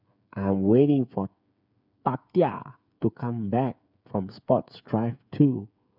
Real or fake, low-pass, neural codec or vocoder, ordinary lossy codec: fake; 5.4 kHz; codec, 16 kHz, 8 kbps, FreqCodec, smaller model; none